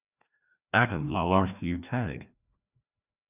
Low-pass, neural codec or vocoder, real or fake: 3.6 kHz; codec, 16 kHz, 1 kbps, FreqCodec, larger model; fake